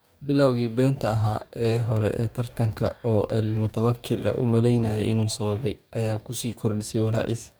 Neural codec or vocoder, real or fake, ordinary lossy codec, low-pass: codec, 44.1 kHz, 2.6 kbps, DAC; fake; none; none